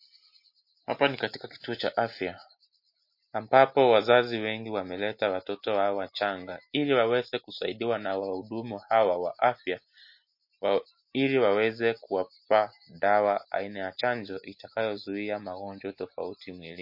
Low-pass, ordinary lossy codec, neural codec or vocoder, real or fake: 5.4 kHz; MP3, 32 kbps; none; real